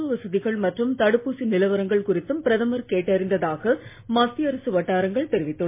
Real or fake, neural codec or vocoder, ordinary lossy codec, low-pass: real; none; MP3, 24 kbps; 3.6 kHz